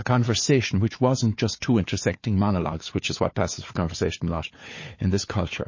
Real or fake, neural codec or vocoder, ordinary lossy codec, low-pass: fake; codec, 16 kHz, 4 kbps, FunCodec, trained on Chinese and English, 50 frames a second; MP3, 32 kbps; 7.2 kHz